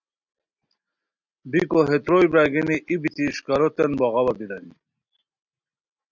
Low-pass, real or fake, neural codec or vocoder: 7.2 kHz; real; none